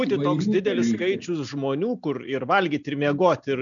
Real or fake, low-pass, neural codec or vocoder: real; 7.2 kHz; none